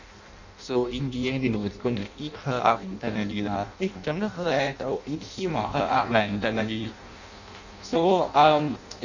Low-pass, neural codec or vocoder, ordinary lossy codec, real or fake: 7.2 kHz; codec, 16 kHz in and 24 kHz out, 0.6 kbps, FireRedTTS-2 codec; none; fake